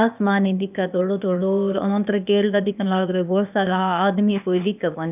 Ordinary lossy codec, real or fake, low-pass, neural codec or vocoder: none; fake; 3.6 kHz; codec, 16 kHz, 0.8 kbps, ZipCodec